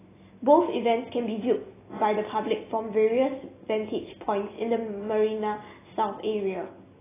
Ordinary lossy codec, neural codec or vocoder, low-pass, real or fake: AAC, 16 kbps; none; 3.6 kHz; real